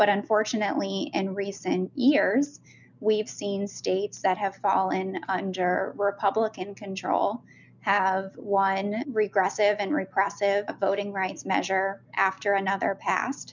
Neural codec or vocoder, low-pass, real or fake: none; 7.2 kHz; real